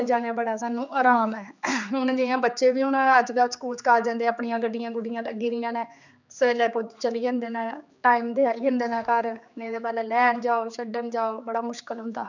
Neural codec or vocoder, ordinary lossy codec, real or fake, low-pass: codec, 16 kHz, 4 kbps, X-Codec, HuBERT features, trained on general audio; none; fake; 7.2 kHz